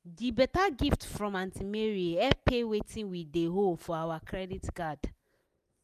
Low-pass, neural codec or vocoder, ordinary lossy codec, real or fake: 14.4 kHz; none; none; real